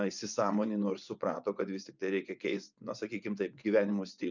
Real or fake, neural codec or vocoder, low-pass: fake; vocoder, 24 kHz, 100 mel bands, Vocos; 7.2 kHz